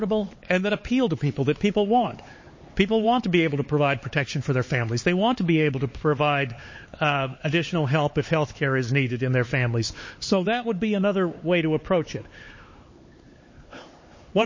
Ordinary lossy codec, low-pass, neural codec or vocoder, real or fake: MP3, 32 kbps; 7.2 kHz; codec, 16 kHz, 4 kbps, X-Codec, HuBERT features, trained on LibriSpeech; fake